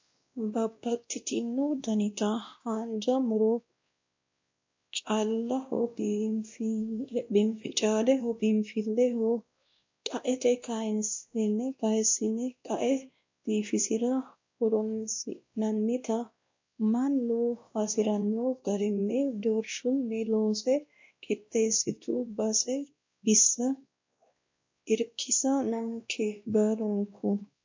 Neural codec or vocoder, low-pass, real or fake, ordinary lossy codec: codec, 16 kHz, 1 kbps, X-Codec, WavLM features, trained on Multilingual LibriSpeech; 7.2 kHz; fake; MP3, 48 kbps